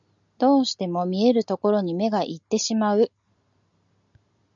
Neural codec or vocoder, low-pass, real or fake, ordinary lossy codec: none; 7.2 kHz; real; MP3, 96 kbps